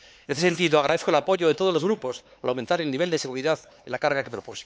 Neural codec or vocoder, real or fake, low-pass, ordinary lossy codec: codec, 16 kHz, 4 kbps, X-Codec, HuBERT features, trained on LibriSpeech; fake; none; none